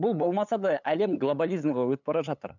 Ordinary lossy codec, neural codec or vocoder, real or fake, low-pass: none; codec, 16 kHz, 8 kbps, FunCodec, trained on LibriTTS, 25 frames a second; fake; 7.2 kHz